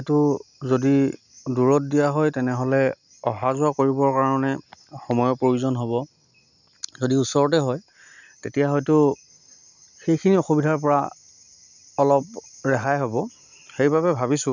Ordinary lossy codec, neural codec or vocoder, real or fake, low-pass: none; none; real; 7.2 kHz